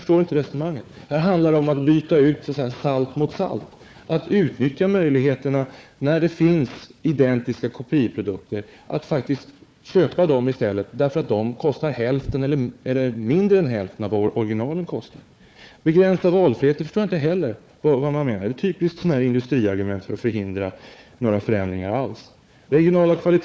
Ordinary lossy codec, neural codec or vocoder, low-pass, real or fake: none; codec, 16 kHz, 4 kbps, FunCodec, trained on Chinese and English, 50 frames a second; none; fake